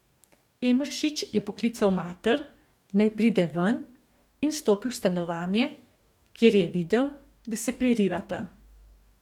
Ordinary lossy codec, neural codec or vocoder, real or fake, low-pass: none; codec, 44.1 kHz, 2.6 kbps, DAC; fake; 19.8 kHz